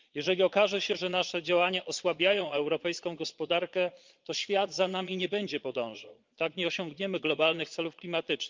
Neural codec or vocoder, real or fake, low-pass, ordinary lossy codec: vocoder, 22.05 kHz, 80 mel bands, Vocos; fake; 7.2 kHz; Opus, 24 kbps